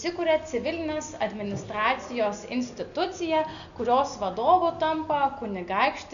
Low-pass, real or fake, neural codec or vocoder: 7.2 kHz; real; none